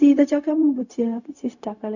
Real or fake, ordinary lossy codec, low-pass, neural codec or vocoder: fake; none; 7.2 kHz; codec, 16 kHz, 0.4 kbps, LongCat-Audio-Codec